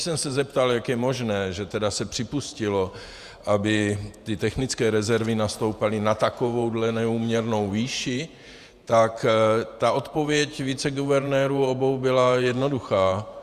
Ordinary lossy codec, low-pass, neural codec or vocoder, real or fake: Opus, 64 kbps; 14.4 kHz; vocoder, 48 kHz, 128 mel bands, Vocos; fake